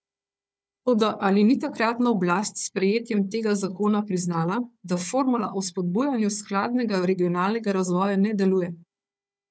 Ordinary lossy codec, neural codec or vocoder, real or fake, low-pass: none; codec, 16 kHz, 4 kbps, FunCodec, trained on Chinese and English, 50 frames a second; fake; none